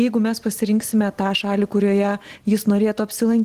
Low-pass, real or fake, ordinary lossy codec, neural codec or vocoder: 14.4 kHz; real; Opus, 16 kbps; none